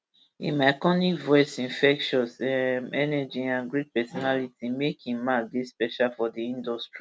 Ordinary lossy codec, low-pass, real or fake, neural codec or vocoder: none; none; real; none